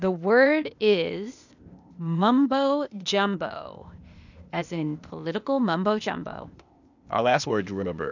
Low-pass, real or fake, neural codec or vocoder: 7.2 kHz; fake; codec, 16 kHz, 0.8 kbps, ZipCodec